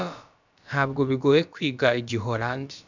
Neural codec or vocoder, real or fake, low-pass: codec, 16 kHz, about 1 kbps, DyCAST, with the encoder's durations; fake; 7.2 kHz